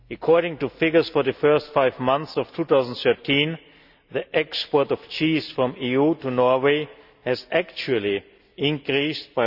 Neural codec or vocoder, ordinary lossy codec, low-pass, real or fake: none; none; 5.4 kHz; real